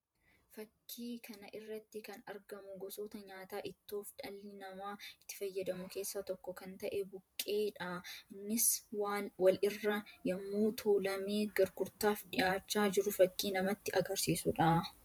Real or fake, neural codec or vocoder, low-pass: fake; vocoder, 44.1 kHz, 128 mel bands every 512 samples, BigVGAN v2; 19.8 kHz